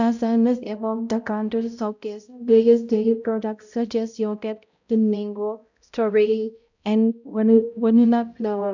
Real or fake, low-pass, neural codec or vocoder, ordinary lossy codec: fake; 7.2 kHz; codec, 16 kHz, 0.5 kbps, X-Codec, HuBERT features, trained on balanced general audio; none